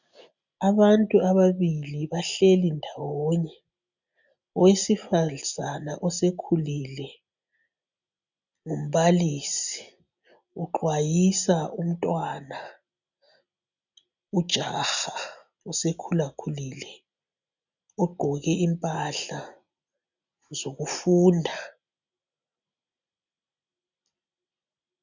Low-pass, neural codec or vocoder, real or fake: 7.2 kHz; none; real